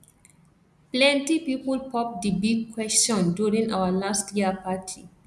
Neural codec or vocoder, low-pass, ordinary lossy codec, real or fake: none; none; none; real